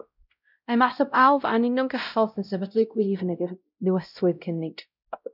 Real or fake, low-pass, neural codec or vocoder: fake; 5.4 kHz; codec, 16 kHz, 0.5 kbps, X-Codec, WavLM features, trained on Multilingual LibriSpeech